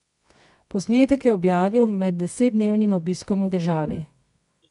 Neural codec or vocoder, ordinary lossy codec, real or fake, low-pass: codec, 24 kHz, 0.9 kbps, WavTokenizer, medium music audio release; none; fake; 10.8 kHz